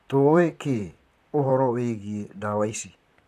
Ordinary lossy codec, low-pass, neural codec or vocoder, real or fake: none; 14.4 kHz; vocoder, 44.1 kHz, 128 mel bands, Pupu-Vocoder; fake